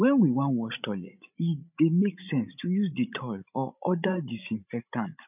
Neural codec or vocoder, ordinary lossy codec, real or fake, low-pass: codec, 16 kHz, 16 kbps, FreqCodec, larger model; none; fake; 3.6 kHz